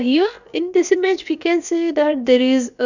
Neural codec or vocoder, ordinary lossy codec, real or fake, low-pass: codec, 16 kHz, about 1 kbps, DyCAST, with the encoder's durations; none; fake; 7.2 kHz